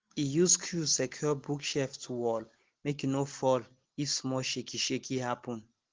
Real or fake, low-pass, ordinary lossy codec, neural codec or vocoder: real; 7.2 kHz; Opus, 16 kbps; none